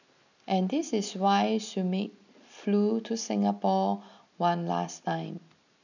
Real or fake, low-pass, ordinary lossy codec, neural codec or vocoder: real; 7.2 kHz; none; none